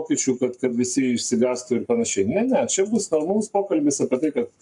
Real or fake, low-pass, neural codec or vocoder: fake; 10.8 kHz; codec, 44.1 kHz, 7.8 kbps, Pupu-Codec